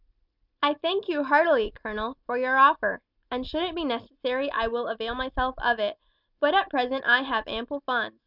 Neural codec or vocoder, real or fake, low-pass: none; real; 5.4 kHz